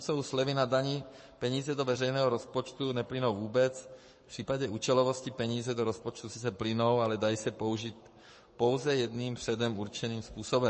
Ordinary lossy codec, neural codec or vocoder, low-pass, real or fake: MP3, 32 kbps; codec, 44.1 kHz, 7.8 kbps, Pupu-Codec; 10.8 kHz; fake